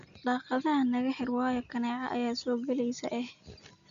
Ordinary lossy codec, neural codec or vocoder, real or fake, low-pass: none; none; real; 7.2 kHz